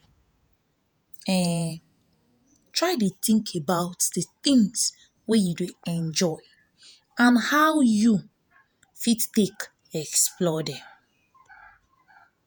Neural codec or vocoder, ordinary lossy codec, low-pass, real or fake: vocoder, 48 kHz, 128 mel bands, Vocos; none; none; fake